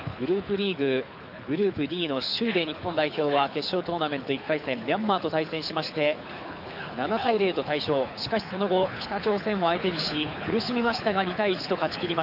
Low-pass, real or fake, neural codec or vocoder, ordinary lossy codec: 5.4 kHz; fake; codec, 24 kHz, 6 kbps, HILCodec; AAC, 48 kbps